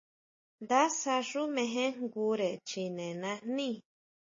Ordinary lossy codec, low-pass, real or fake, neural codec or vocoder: MP3, 32 kbps; 7.2 kHz; real; none